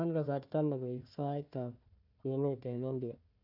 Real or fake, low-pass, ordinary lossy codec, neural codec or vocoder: fake; 5.4 kHz; none; codec, 16 kHz, 1 kbps, FunCodec, trained on Chinese and English, 50 frames a second